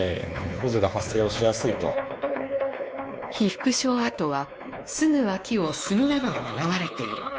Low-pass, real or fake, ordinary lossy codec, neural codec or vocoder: none; fake; none; codec, 16 kHz, 2 kbps, X-Codec, WavLM features, trained on Multilingual LibriSpeech